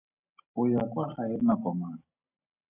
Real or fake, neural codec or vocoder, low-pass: real; none; 3.6 kHz